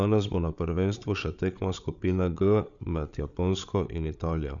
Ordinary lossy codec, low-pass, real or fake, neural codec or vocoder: none; 7.2 kHz; fake; codec, 16 kHz, 8 kbps, FreqCodec, larger model